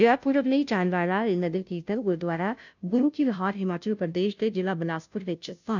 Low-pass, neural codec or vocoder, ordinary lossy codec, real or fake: 7.2 kHz; codec, 16 kHz, 0.5 kbps, FunCodec, trained on Chinese and English, 25 frames a second; none; fake